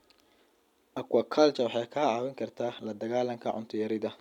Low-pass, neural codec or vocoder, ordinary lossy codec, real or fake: 19.8 kHz; none; none; real